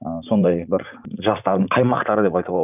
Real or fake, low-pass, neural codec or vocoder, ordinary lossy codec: fake; 3.6 kHz; vocoder, 44.1 kHz, 80 mel bands, Vocos; none